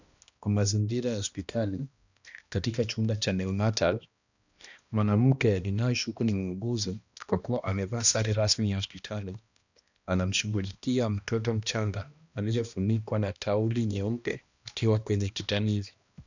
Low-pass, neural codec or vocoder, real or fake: 7.2 kHz; codec, 16 kHz, 1 kbps, X-Codec, HuBERT features, trained on balanced general audio; fake